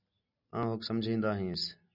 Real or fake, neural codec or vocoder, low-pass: real; none; 5.4 kHz